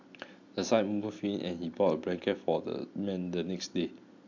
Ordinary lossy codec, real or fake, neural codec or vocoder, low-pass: MP3, 64 kbps; real; none; 7.2 kHz